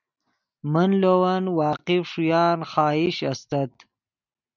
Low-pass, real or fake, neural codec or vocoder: 7.2 kHz; real; none